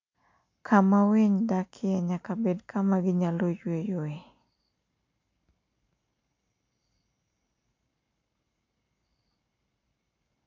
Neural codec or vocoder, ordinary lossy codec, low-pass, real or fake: none; MP3, 48 kbps; 7.2 kHz; real